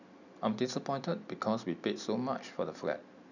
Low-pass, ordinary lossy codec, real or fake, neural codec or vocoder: 7.2 kHz; none; real; none